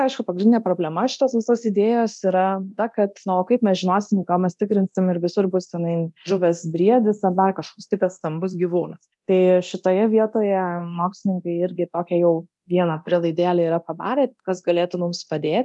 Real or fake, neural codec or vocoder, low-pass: fake; codec, 24 kHz, 0.9 kbps, DualCodec; 10.8 kHz